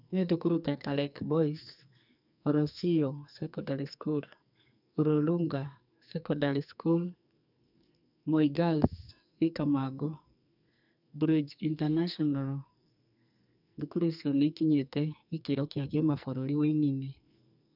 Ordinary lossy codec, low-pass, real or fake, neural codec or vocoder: none; 5.4 kHz; fake; codec, 44.1 kHz, 2.6 kbps, SNAC